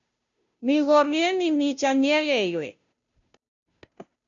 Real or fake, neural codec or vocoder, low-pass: fake; codec, 16 kHz, 0.5 kbps, FunCodec, trained on Chinese and English, 25 frames a second; 7.2 kHz